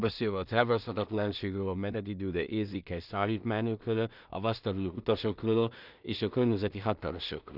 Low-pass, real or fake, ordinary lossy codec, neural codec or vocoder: 5.4 kHz; fake; none; codec, 16 kHz in and 24 kHz out, 0.4 kbps, LongCat-Audio-Codec, two codebook decoder